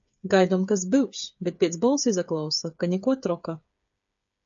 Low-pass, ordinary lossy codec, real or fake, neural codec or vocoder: 7.2 kHz; AAC, 64 kbps; fake; codec, 16 kHz, 8 kbps, FreqCodec, smaller model